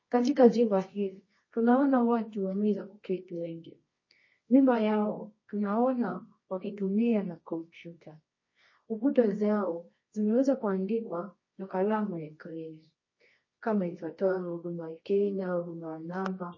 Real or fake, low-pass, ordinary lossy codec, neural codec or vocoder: fake; 7.2 kHz; MP3, 32 kbps; codec, 24 kHz, 0.9 kbps, WavTokenizer, medium music audio release